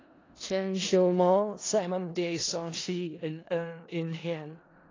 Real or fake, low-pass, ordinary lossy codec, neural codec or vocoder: fake; 7.2 kHz; AAC, 32 kbps; codec, 16 kHz in and 24 kHz out, 0.4 kbps, LongCat-Audio-Codec, four codebook decoder